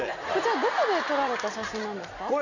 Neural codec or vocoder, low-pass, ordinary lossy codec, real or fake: none; 7.2 kHz; none; real